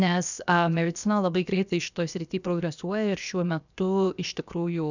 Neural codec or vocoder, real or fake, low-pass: codec, 16 kHz, 0.7 kbps, FocalCodec; fake; 7.2 kHz